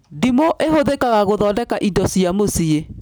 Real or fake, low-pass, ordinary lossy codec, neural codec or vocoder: real; none; none; none